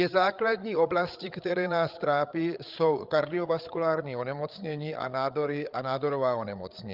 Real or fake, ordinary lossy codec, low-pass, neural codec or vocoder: fake; Opus, 24 kbps; 5.4 kHz; codec, 16 kHz, 8 kbps, FreqCodec, larger model